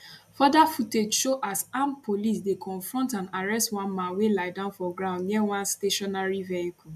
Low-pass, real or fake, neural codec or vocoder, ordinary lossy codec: 14.4 kHz; real; none; none